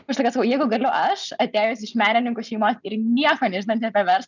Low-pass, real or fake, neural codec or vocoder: 7.2 kHz; real; none